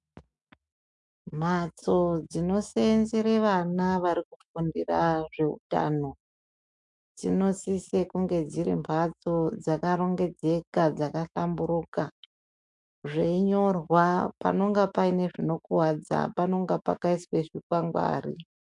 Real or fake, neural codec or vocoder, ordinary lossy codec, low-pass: fake; autoencoder, 48 kHz, 128 numbers a frame, DAC-VAE, trained on Japanese speech; MP3, 64 kbps; 10.8 kHz